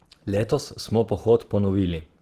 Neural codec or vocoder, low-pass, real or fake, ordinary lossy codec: vocoder, 44.1 kHz, 128 mel bands every 512 samples, BigVGAN v2; 14.4 kHz; fake; Opus, 16 kbps